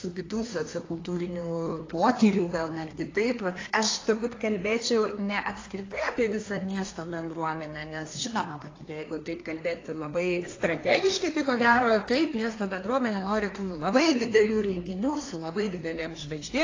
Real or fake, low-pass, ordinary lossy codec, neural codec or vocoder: fake; 7.2 kHz; AAC, 32 kbps; codec, 24 kHz, 1 kbps, SNAC